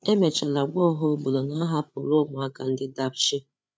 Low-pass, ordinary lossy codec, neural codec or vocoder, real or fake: none; none; codec, 16 kHz, 8 kbps, FreqCodec, larger model; fake